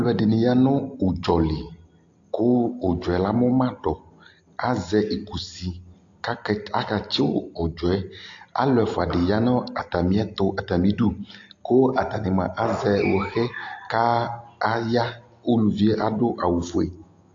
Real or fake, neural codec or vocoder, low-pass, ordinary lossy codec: real; none; 7.2 kHz; AAC, 48 kbps